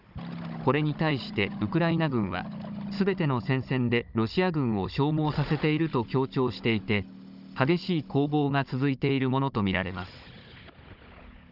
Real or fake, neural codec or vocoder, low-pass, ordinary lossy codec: fake; codec, 16 kHz, 16 kbps, FunCodec, trained on Chinese and English, 50 frames a second; 5.4 kHz; none